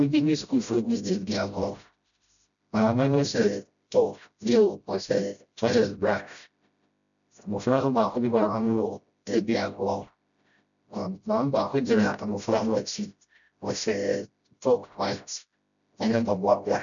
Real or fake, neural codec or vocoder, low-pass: fake; codec, 16 kHz, 0.5 kbps, FreqCodec, smaller model; 7.2 kHz